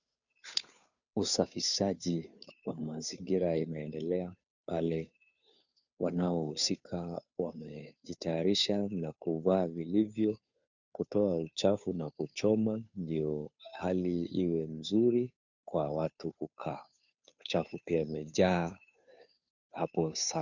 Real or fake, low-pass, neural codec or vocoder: fake; 7.2 kHz; codec, 16 kHz, 2 kbps, FunCodec, trained on Chinese and English, 25 frames a second